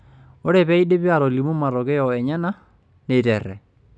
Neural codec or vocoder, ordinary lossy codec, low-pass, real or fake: none; none; none; real